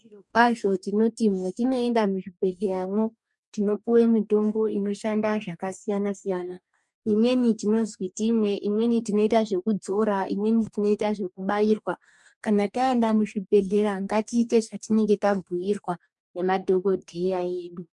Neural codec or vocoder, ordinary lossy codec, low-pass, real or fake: codec, 44.1 kHz, 2.6 kbps, DAC; MP3, 96 kbps; 10.8 kHz; fake